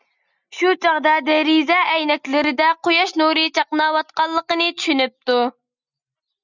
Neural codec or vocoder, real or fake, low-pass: none; real; 7.2 kHz